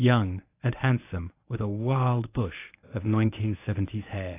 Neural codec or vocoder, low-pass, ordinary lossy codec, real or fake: codec, 24 kHz, 0.9 kbps, WavTokenizer, medium speech release version 1; 3.6 kHz; AAC, 24 kbps; fake